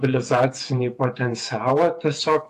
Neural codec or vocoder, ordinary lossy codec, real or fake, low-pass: vocoder, 48 kHz, 128 mel bands, Vocos; AAC, 64 kbps; fake; 14.4 kHz